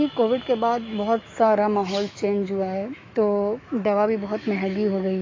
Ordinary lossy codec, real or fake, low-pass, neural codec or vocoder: AAC, 32 kbps; fake; 7.2 kHz; autoencoder, 48 kHz, 128 numbers a frame, DAC-VAE, trained on Japanese speech